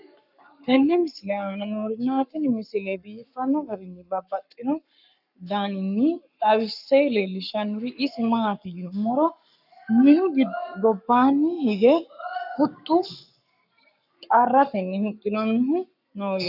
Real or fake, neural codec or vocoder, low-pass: fake; codec, 44.1 kHz, 7.8 kbps, Pupu-Codec; 5.4 kHz